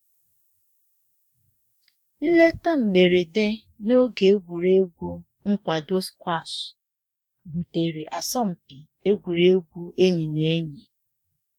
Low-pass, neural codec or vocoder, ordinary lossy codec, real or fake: 19.8 kHz; codec, 44.1 kHz, 2.6 kbps, DAC; none; fake